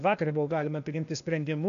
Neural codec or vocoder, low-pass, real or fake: codec, 16 kHz, 0.8 kbps, ZipCodec; 7.2 kHz; fake